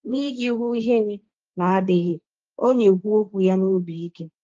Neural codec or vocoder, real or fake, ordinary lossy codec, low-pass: codec, 16 kHz, 1.1 kbps, Voila-Tokenizer; fake; Opus, 24 kbps; 7.2 kHz